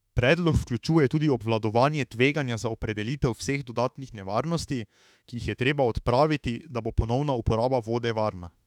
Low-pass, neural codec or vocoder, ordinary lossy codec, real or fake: 19.8 kHz; autoencoder, 48 kHz, 32 numbers a frame, DAC-VAE, trained on Japanese speech; none; fake